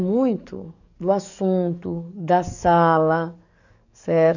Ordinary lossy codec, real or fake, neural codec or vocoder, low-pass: none; fake; codec, 44.1 kHz, 7.8 kbps, DAC; 7.2 kHz